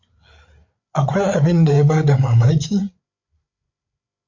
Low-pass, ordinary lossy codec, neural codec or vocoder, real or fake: 7.2 kHz; MP3, 48 kbps; codec, 16 kHz, 8 kbps, FreqCodec, larger model; fake